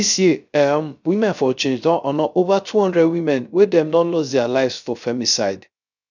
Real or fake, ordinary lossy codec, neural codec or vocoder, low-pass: fake; none; codec, 16 kHz, 0.3 kbps, FocalCodec; 7.2 kHz